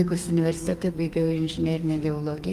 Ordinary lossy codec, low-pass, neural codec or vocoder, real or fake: Opus, 32 kbps; 14.4 kHz; codec, 32 kHz, 1.9 kbps, SNAC; fake